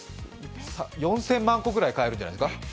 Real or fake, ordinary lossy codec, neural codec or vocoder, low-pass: real; none; none; none